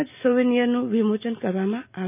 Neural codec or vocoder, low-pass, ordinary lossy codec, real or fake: none; 3.6 kHz; none; real